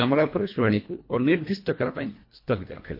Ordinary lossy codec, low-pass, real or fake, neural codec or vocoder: MP3, 32 kbps; 5.4 kHz; fake; codec, 24 kHz, 1.5 kbps, HILCodec